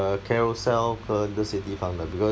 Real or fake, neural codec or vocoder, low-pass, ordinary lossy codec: real; none; none; none